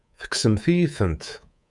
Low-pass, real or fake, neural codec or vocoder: 10.8 kHz; fake; codec, 24 kHz, 3.1 kbps, DualCodec